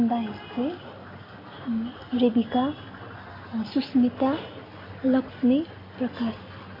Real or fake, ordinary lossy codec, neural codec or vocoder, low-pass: real; AAC, 24 kbps; none; 5.4 kHz